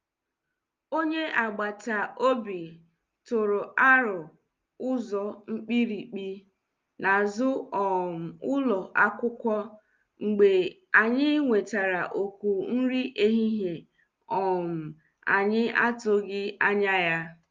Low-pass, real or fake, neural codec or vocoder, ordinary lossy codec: 7.2 kHz; real; none; Opus, 24 kbps